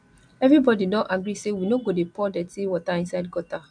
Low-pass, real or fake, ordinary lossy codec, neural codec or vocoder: 9.9 kHz; real; AAC, 64 kbps; none